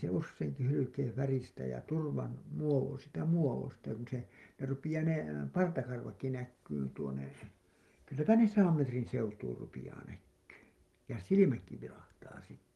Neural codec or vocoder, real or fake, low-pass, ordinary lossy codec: none; real; 19.8 kHz; Opus, 24 kbps